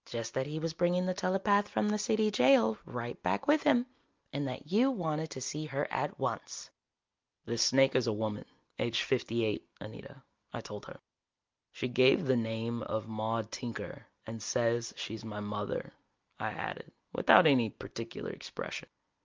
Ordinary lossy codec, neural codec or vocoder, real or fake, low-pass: Opus, 24 kbps; none; real; 7.2 kHz